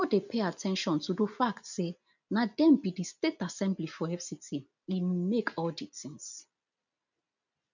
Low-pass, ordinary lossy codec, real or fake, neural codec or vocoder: 7.2 kHz; none; real; none